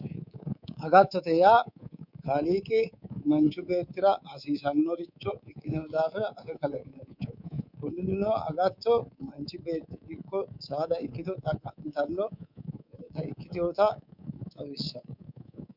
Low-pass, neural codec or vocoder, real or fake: 5.4 kHz; codec, 24 kHz, 3.1 kbps, DualCodec; fake